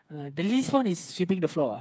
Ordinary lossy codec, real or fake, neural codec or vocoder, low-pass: none; fake; codec, 16 kHz, 4 kbps, FreqCodec, smaller model; none